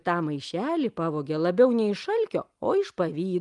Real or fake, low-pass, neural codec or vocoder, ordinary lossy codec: real; 10.8 kHz; none; Opus, 32 kbps